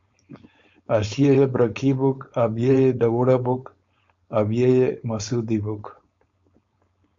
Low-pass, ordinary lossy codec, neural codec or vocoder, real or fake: 7.2 kHz; MP3, 48 kbps; codec, 16 kHz, 4.8 kbps, FACodec; fake